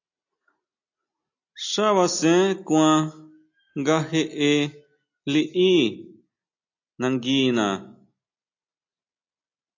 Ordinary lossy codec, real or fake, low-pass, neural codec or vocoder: AAC, 48 kbps; real; 7.2 kHz; none